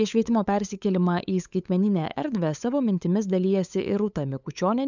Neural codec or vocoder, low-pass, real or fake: codec, 16 kHz, 8 kbps, FunCodec, trained on LibriTTS, 25 frames a second; 7.2 kHz; fake